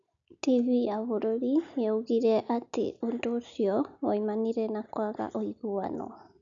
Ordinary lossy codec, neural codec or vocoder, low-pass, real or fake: none; none; 7.2 kHz; real